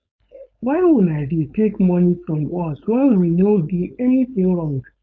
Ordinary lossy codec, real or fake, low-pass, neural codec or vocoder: none; fake; none; codec, 16 kHz, 4.8 kbps, FACodec